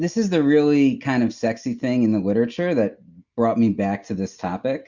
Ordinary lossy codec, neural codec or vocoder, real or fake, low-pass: Opus, 64 kbps; none; real; 7.2 kHz